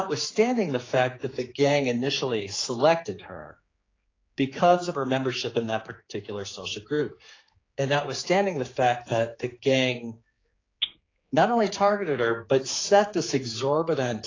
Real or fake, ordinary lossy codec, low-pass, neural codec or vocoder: fake; AAC, 32 kbps; 7.2 kHz; codec, 16 kHz, 4 kbps, X-Codec, HuBERT features, trained on general audio